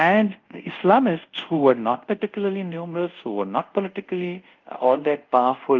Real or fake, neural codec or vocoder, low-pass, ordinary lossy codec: fake; codec, 24 kHz, 0.5 kbps, DualCodec; 7.2 kHz; Opus, 32 kbps